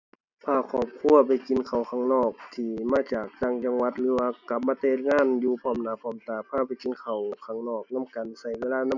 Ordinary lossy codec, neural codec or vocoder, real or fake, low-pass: none; none; real; 7.2 kHz